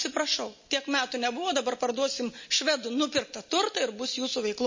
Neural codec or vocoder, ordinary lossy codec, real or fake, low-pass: none; MP3, 32 kbps; real; 7.2 kHz